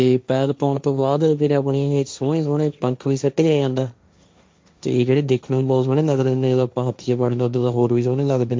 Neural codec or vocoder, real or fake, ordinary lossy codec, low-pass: codec, 16 kHz, 1.1 kbps, Voila-Tokenizer; fake; none; none